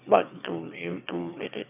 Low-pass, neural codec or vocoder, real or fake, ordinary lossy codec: 3.6 kHz; autoencoder, 22.05 kHz, a latent of 192 numbers a frame, VITS, trained on one speaker; fake; none